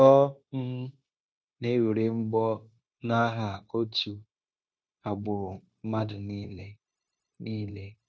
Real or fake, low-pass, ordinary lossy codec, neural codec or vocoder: fake; none; none; codec, 16 kHz, 0.9 kbps, LongCat-Audio-Codec